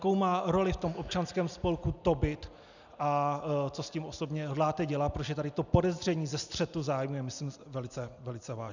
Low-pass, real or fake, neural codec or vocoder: 7.2 kHz; real; none